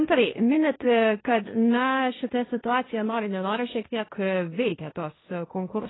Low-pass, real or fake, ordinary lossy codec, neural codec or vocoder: 7.2 kHz; fake; AAC, 16 kbps; codec, 16 kHz, 1.1 kbps, Voila-Tokenizer